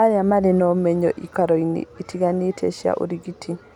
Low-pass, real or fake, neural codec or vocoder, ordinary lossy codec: 19.8 kHz; real; none; none